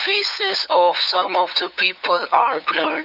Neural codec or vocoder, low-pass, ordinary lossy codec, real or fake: codec, 16 kHz, 8 kbps, FunCodec, trained on LibriTTS, 25 frames a second; 5.4 kHz; none; fake